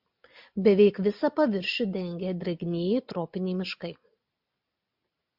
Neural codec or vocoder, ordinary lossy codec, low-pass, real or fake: none; MP3, 48 kbps; 5.4 kHz; real